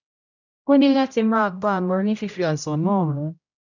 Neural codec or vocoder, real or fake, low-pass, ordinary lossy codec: codec, 16 kHz, 0.5 kbps, X-Codec, HuBERT features, trained on general audio; fake; 7.2 kHz; none